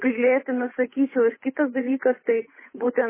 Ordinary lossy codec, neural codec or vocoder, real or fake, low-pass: MP3, 16 kbps; codec, 16 kHz, 8 kbps, FunCodec, trained on Chinese and English, 25 frames a second; fake; 3.6 kHz